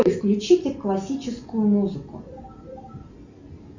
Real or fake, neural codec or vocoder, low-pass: real; none; 7.2 kHz